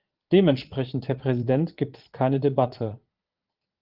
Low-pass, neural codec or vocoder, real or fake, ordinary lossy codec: 5.4 kHz; vocoder, 22.05 kHz, 80 mel bands, Vocos; fake; Opus, 32 kbps